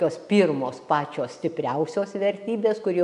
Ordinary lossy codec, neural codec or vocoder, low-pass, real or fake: AAC, 96 kbps; none; 10.8 kHz; real